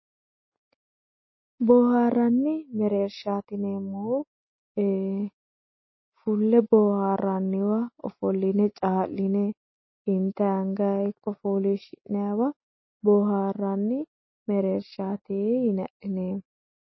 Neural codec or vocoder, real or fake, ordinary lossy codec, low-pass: none; real; MP3, 24 kbps; 7.2 kHz